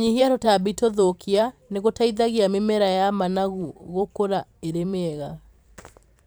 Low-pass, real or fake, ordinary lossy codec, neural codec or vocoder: none; fake; none; vocoder, 44.1 kHz, 128 mel bands every 512 samples, BigVGAN v2